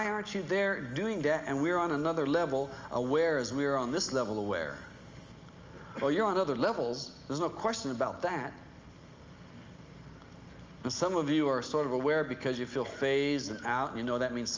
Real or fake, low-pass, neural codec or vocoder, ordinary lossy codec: real; 7.2 kHz; none; Opus, 32 kbps